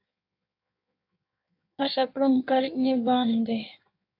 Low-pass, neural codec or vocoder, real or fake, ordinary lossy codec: 5.4 kHz; codec, 16 kHz in and 24 kHz out, 1.1 kbps, FireRedTTS-2 codec; fake; AAC, 32 kbps